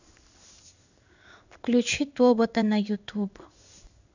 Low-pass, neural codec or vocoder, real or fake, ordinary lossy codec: 7.2 kHz; codec, 16 kHz in and 24 kHz out, 1 kbps, XY-Tokenizer; fake; none